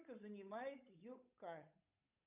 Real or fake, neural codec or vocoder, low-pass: fake; codec, 16 kHz, 8 kbps, FunCodec, trained on Chinese and English, 25 frames a second; 3.6 kHz